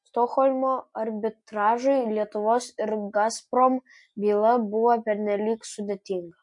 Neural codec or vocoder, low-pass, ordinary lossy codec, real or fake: none; 10.8 kHz; MP3, 48 kbps; real